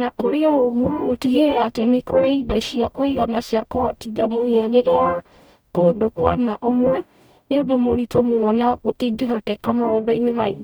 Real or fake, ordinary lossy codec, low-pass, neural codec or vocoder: fake; none; none; codec, 44.1 kHz, 0.9 kbps, DAC